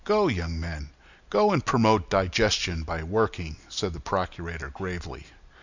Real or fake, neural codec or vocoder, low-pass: real; none; 7.2 kHz